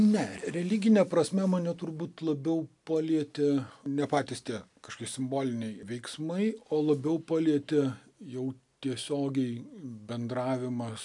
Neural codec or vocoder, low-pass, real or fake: none; 10.8 kHz; real